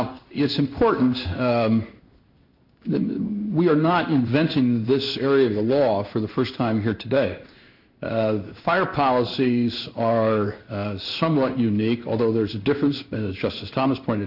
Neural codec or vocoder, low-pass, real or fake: codec, 16 kHz in and 24 kHz out, 1 kbps, XY-Tokenizer; 5.4 kHz; fake